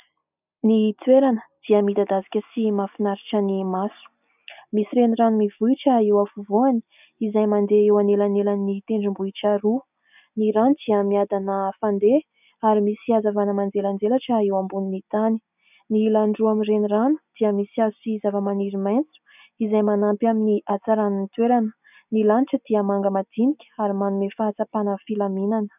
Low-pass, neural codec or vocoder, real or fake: 3.6 kHz; none; real